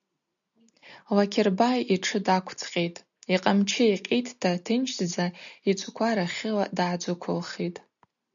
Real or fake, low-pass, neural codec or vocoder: real; 7.2 kHz; none